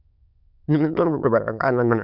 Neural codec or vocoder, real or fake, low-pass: autoencoder, 22.05 kHz, a latent of 192 numbers a frame, VITS, trained on many speakers; fake; 5.4 kHz